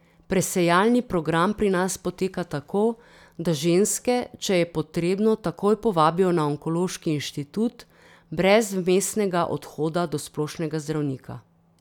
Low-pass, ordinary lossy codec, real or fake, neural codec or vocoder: 19.8 kHz; none; real; none